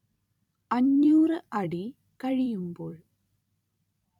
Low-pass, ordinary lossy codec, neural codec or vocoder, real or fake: 19.8 kHz; none; vocoder, 44.1 kHz, 128 mel bands every 256 samples, BigVGAN v2; fake